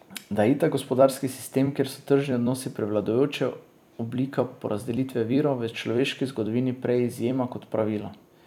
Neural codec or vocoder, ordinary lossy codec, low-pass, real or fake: vocoder, 44.1 kHz, 128 mel bands every 256 samples, BigVGAN v2; none; 19.8 kHz; fake